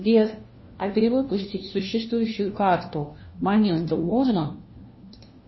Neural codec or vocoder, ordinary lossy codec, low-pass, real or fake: codec, 16 kHz, 1 kbps, FunCodec, trained on LibriTTS, 50 frames a second; MP3, 24 kbps; 7.2 kHz; fake